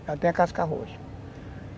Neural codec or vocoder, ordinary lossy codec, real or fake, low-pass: none; none; real; none